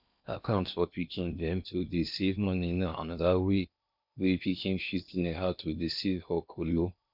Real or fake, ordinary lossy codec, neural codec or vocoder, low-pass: fake; none; codec, 16 kHz in and 24 kHz out, 0.6 kbps, FocalCodec, streaming, 4096 codes; 5.4 kHz